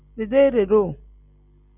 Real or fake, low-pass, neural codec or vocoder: real; 3.6 kHz; none